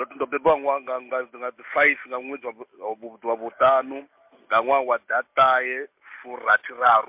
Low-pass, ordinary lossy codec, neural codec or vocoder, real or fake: 3.6 kHz; MP3, 32 kbps; none; real